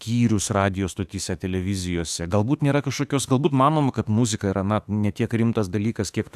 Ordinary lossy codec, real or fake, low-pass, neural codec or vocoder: AAC, 96 kbps; fake; 14.4 kHz; autoencoder, 48 kHz, 32 numbers a frame, DAC-VAE, trained on Japanese speech